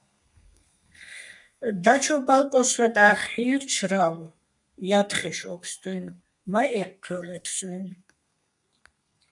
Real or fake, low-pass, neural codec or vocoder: fake; 10.8 kHz; codec, 32 kHz, 1.9 kbps, SNAC